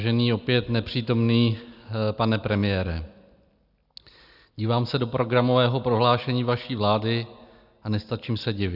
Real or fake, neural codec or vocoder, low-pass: real; none; 5.4 kHz